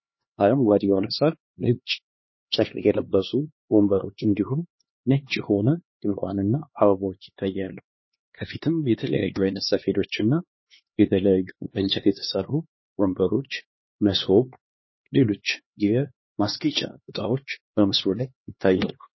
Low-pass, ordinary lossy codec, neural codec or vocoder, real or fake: 7.2 kHz; MP3, 24 kbps; codec, 16 kHz, 2 kbps, X-Codec, HuBERT features, trained on LibriSpeech; fake